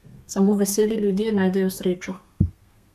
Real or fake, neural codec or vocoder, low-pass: fake; codec, 32 kHz, 1.9 kbps, SNAC; 14.4 kHz